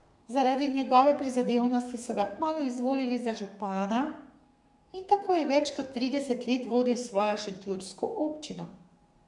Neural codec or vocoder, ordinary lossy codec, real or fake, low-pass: codec, 32 kHz, 1.9 kbps, SNAC; none; fake; 10.8 kHz